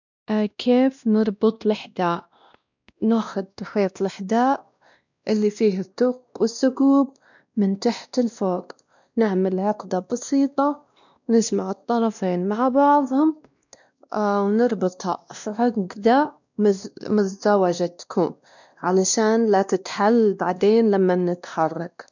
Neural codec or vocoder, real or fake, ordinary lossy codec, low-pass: codec, 16 kHz, 1 kbps, X-Codec, WavLM features, trained on Multilingual LibriSpeech; fake; none; 7.2 kHz